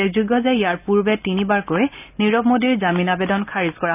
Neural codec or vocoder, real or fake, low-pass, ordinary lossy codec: none; real; 3.6 kHz; none